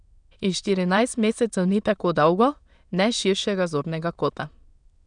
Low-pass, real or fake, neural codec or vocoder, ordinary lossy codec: 9.9 kHz; fake; autoencoder, 22.05 kHz, a latent of 192 numbers a frame, VITS, trained on many speakers; none